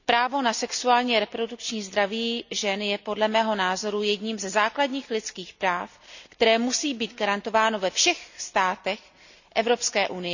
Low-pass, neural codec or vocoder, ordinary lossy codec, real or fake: 7.2 kHz; none; none; real